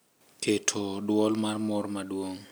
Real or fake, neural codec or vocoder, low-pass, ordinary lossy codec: real; none; none; none